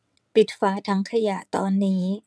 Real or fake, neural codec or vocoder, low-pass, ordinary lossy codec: fake; vocoder, 22.05 kHz, 80 mel bands, WaveNeXt; none; none